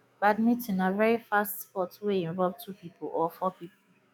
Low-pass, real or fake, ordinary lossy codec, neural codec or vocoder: none; fake; none; autoencoder, 48 kHz, 128 numbers a frame, DAC-VAE, trained on Japanese speech